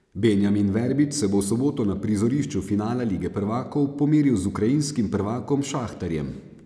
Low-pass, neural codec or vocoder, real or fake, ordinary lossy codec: none; none; real; none